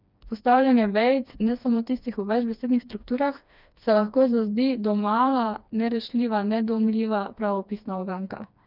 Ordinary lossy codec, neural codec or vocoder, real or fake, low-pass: none; codec, 16 kHz, 2 kbps, FreqCodec, smaller model; fake; 5.4 kHz